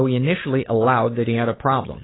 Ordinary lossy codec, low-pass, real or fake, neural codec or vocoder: AAC, 16 kbps; 7.2 kHz; fake; codec, 16 kHz, 8 kbps, FunCodec, trained on Chinese and English, 25 frames a second